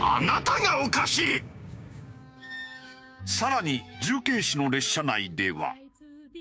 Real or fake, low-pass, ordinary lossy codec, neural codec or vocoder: fake; none; none; codec, 16 kHz, 6 kbps, DAC